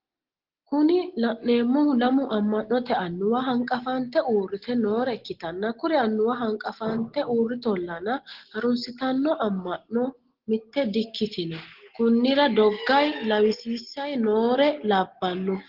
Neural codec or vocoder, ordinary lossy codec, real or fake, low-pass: none; Opus, 16 kbps; real; 5.4 kHz